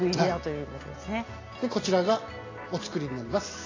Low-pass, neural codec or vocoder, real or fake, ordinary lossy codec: 7.2 kHz; none; real; AAC, 32 kbps